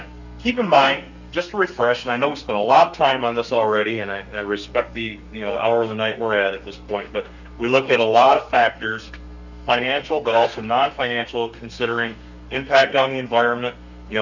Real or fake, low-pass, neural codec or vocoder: fake; 7.2 kHz; codec, 32 kHz, 1.9 kbps, SNAC